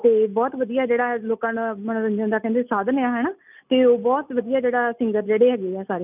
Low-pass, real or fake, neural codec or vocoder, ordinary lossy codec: 3.6 kHz; real; none; none